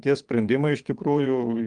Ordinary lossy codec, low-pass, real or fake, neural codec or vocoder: Opus, 32 kbps; 9.9 kHz; fake; vocoder, 22.05 kHz, 80 mel bands, WaveNeXt